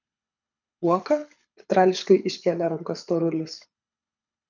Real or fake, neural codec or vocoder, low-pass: fake; codec, 24 kHz, 6 kbps, HILCodec; 7.2 kHz